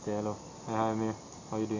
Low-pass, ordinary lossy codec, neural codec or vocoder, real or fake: 7.2 kHz; AAC, 32 kbps; none; real